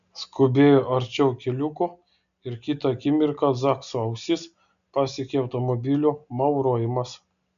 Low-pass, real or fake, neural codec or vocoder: 7.2 kHz; real; none